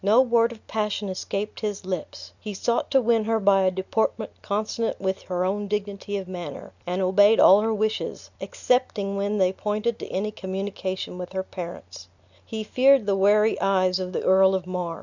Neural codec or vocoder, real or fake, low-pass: none; real; 7.2 kHz